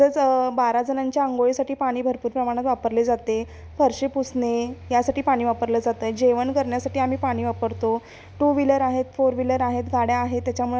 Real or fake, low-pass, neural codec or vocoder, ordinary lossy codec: real; none; none; none